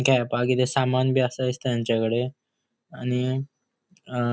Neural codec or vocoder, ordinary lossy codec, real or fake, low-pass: none; none; real; none